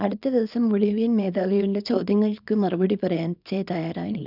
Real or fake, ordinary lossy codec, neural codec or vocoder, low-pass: fake; none; codec, 24 kHz, 0.9 kbps, WavTokenizer, small release; 5.4 kHz